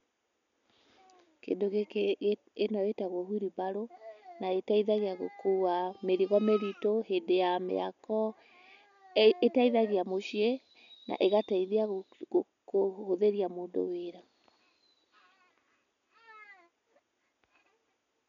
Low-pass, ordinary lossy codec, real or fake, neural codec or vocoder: 7.2 kHz; none; real; none